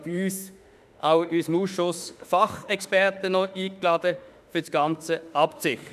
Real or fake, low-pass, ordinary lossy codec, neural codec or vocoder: fake; 14.4 kHz; none; autoencoder, 48 kHz, 32 numbers a frame, DAC-VAE, trained on Japanese speech